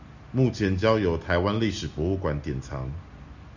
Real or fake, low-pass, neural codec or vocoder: real; 7.2 kHz; none